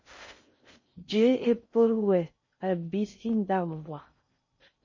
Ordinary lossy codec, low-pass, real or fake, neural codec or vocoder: MP3, 32 kbps; 7.2 kHz; fake; codec, 16 kHz in and 24 kHz out, 0.6 kbps, FocalCodec, streaming, 4096 codes